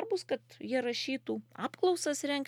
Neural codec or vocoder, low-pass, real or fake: none; 19.8 kHz; real